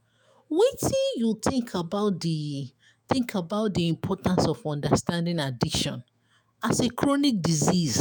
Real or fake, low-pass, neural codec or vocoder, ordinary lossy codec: fake; none; autoencoder, 48 kHz, 128 numbers a frame, DAC-VAE, trained on Japanese speech; none